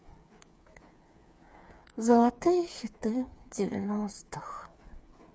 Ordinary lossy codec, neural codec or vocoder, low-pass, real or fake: none; codec, 16 kHz, 8 kbps, FreqCodec, smaller model; none; fake